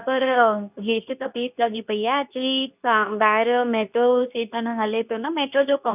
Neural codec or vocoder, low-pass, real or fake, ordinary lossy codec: codec, 24 kHz, 0.9 kbps, WavTokenizer, medium speech release version 2; 3.6 kHz; fake; none